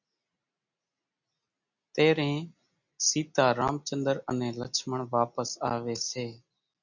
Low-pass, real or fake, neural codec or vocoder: 7.2 kHz; real; none